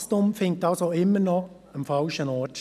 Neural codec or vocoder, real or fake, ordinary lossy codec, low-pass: none; real; none; 14.4 kHz